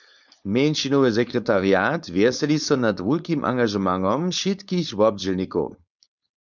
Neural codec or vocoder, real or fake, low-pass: codec, 16 kHz, 4.8 kbps, FACodec; fake; 7.2 kHz